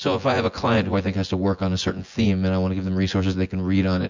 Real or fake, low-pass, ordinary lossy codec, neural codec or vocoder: fake; 7.2 kHz; MP3, 64 kbps; vocoder, 24 kHz, 100 mel bands, Vocos